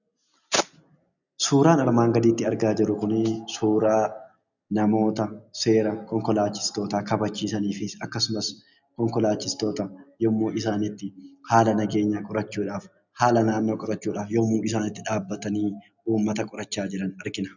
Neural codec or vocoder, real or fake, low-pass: none; real; 7.2 kHz